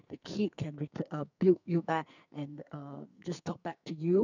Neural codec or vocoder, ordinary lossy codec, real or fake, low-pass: codec, 44.1 kHz, 2.6 kbps, SNAC; none; fake; 7.2 kHz